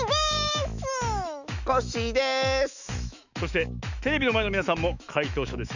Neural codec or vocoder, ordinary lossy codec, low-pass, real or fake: autoencoder, 48 kHz, 128 numbers a frame, DAC-VAE, trained on Japanese speech; none; 7.2 kHz; fake